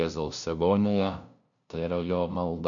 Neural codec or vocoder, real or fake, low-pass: codec, 16 kHz, 0.5 kbps, FunCodec, trained on LibriTTS, 25 frames a second; fake; 7.2 kHz